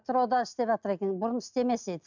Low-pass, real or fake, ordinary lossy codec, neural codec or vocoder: 7.2 kHz; real; none; none